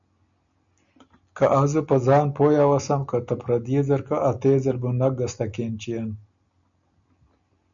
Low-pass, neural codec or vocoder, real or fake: 7.2 kHz; none; real